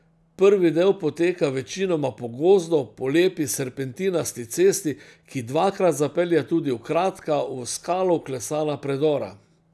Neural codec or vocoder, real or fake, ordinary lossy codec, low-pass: none; real; none; none